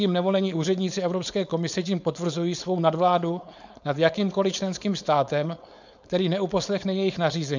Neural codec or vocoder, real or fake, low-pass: codec, 16 kHz, 4.8 kbps, FACodec; fake; 7.2 kHz